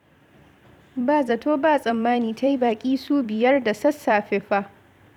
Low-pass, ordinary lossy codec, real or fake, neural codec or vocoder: 19.8 kHz; none; fake; vocoder, 44.1 kHz, 128 mel bands every 256 samples, BigVGAN v2